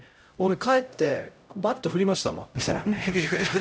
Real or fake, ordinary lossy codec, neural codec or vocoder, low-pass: fake; none; codec, 16 kHz, 0.5 kbps, X-Codec, HuBERT features, trained on LibriSpeech; none